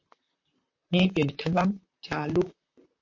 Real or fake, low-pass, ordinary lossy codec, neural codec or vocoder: fake; 7.2 kHz; MP3, 48 kbps; vocoder, 44.1 kHz, 128 mel bands, Pupu-Vocoder